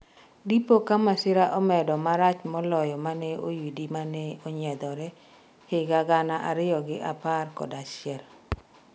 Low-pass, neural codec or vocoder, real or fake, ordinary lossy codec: none; none; real; none